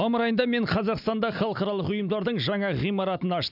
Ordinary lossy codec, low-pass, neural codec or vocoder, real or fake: none; 5.4 kHz; none; real